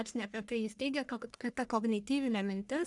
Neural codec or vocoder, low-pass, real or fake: codec, 44.1 kHz, 1.7 kbps, Pupu-Codec; 10.8 kHz; fake